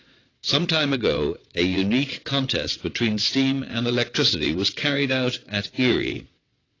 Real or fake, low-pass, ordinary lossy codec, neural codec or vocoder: fake; 7.2 kHz; AAC, 32 kbps; vocoder, 22.05 kHz, 80 mel bands, WaveNeXt